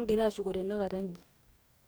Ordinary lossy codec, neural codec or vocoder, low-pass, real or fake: none; codec, 44.1 kHz, 2.6 kbps, DAC; none; fake